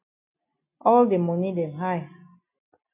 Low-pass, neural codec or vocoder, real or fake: 3.6 kHz; none; real